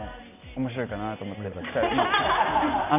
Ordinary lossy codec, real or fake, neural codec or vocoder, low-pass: none; real; none; 3.6 kHz